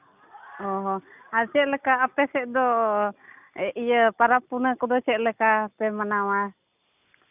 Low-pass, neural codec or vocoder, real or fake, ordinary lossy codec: 3.6 kHz; none; real; none